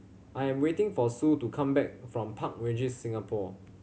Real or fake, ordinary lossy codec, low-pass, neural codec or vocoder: real; none; none; none